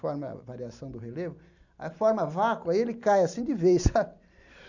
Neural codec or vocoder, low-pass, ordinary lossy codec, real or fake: none; 7.2 kHz; none; real